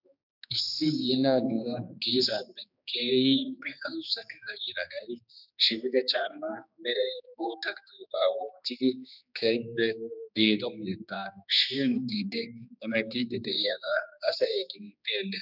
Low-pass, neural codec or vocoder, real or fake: 5.4 kHz; codec, 16 kHz, 2 kbps, X-Codec, HuBERT features, trained on general audio; fake